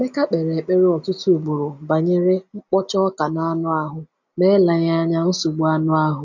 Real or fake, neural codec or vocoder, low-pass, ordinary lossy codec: real; none; 7.2 kHz; none